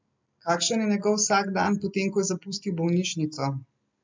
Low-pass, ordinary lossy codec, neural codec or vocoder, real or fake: 7.2 kHz; MP3, 64 kbps; none; real